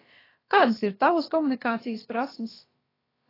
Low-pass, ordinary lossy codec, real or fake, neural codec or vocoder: 5.4 kHz; AAC, 24 kbps; fake; codec, 16 kHz, about 1 kbps, DyCAST, with the encoder's durations